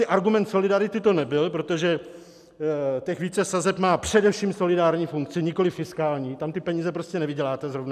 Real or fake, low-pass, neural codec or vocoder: real; 14.4 kHz; none